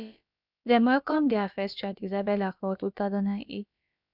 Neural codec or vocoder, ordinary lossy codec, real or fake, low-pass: codec, 16 kHz, about 1 kbps, DyCAST, with the encoder's durations; none; fake; 5.4 kHz